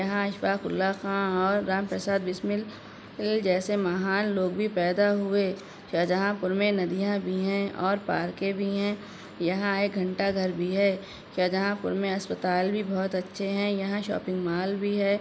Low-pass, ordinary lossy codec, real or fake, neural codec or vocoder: none; none; real; none